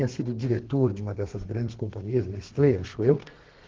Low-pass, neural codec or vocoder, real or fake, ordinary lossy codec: 7.2 kHz; codec, 32 kHz, 1.9 kbps, SNAC; fake; Opus, 16 kbps